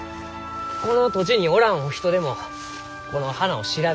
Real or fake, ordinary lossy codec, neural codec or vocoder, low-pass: real; none; none; none